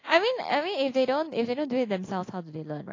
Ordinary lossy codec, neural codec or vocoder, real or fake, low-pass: AAC, 32 kbps; none; real; 7.2 kHz